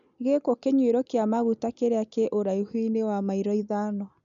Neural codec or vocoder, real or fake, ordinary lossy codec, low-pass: none; real; none; 7.2 kHz